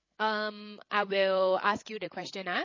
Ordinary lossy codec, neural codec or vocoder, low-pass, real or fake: MP3, 32 kbps; codec, 16 kHz, 8 kbps, FreqCodec, larger model; 7.2 kHz; fake